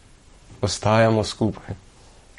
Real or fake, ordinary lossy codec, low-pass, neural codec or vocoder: fake; MP3, 48 kbps; 19.8 kHz; codec, 44.1 kHz, 7.8 kbps, Pupu-Codec